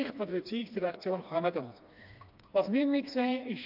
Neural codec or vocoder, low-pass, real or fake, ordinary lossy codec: codec, 16 kHz, 2 kbps, FreqCodec, smaller model; 5.4 kHz; fake; AAC, 48 kbps